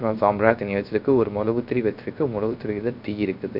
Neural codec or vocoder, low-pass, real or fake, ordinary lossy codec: codec, 16 kHz, 0.3 kbps, FocalCodec; 5.4 kHz; fake; none